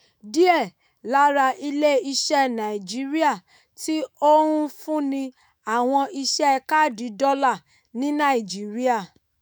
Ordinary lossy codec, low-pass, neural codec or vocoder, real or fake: none; none; autoencoder, 48 kHz, 128 numbers a frame, DAC-VAE, trained on Japanese speech; fake